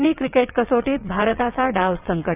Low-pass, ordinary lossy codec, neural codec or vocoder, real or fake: 3.6 kHz; none; vocoder, 22.05 kHz, 80 mel bands, WaveNeXt; fake